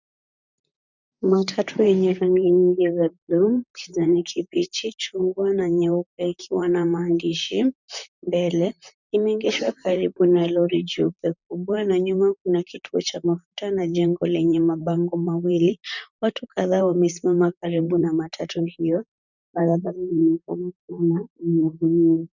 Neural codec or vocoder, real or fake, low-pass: vocoder, 44.1 kHz, 128 mel bands, Pupu-Vocoder; fake; 7.2 kHz